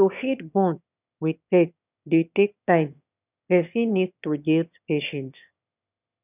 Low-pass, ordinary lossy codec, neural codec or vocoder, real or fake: 3.6 kHz; none; autoencoder, 22.05 kHz, a latent of 192 numbers a frame, VITS, trained on one speaker; fake